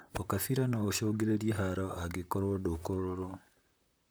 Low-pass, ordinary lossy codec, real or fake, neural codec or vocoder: none; none; fake; vocoder, 44.1 kHz, 128 mel bands, Pupu-Vocoder